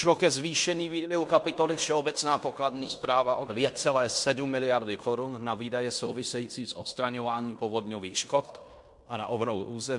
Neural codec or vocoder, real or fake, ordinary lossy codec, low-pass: codec, 16 kHz in and 24 kHz out, 0.9 kbps, LongCat-Audio-Codec, fine tuned four codebook decoder; fake; MP3, 64 kbps; 10.8 kHz